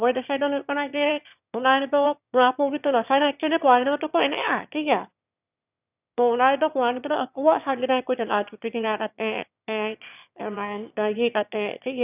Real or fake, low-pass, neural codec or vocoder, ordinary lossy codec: fake; 3.6 kHz; autoencoder, 22.05 kHz, a latent of 192 numbers a frame, VITS, trained on one speaker; none